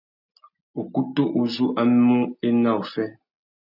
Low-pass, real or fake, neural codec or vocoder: 5.4 kHz; real; none